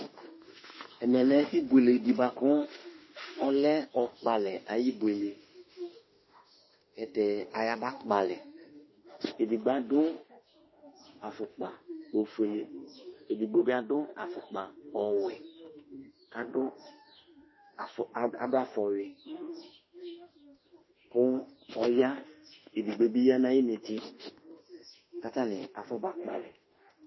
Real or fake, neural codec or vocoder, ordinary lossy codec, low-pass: fake; autoencoder, 48 kHz, 32 numbers a frame, DAC-VAE, trained on Japanese speech; MP3, 24 kbps; 7.2 kHz